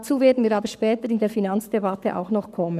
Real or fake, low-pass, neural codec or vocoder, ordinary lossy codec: fake; 14.4 kHz; codec, 44.1 kHz, 7.8 kbps, Pupu-Codec; none